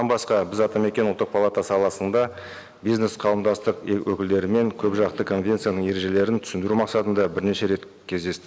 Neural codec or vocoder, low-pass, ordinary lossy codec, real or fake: none; none; none; real